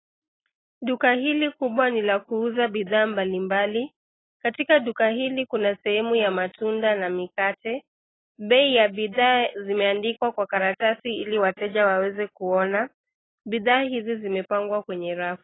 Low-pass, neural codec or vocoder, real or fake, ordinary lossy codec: 7.2 kHz; none; real; AAC, 16 kbps